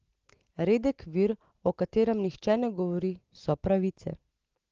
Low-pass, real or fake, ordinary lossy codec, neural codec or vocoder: 7.2 kHz; real; Opus, 16 kbps; none